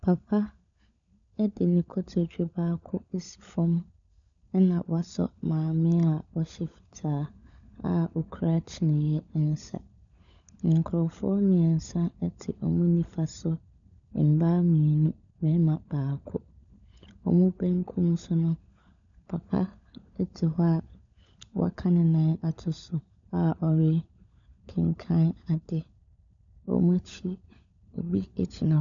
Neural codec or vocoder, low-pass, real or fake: codec, 16 kHz, 16 kbps, FunCodec, trained on LibriTTS, 50 frames a second; 7.2 kHz; fake